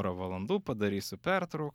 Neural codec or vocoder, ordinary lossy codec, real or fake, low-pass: none; MP3, 96 kbps; real; 19.8 kHz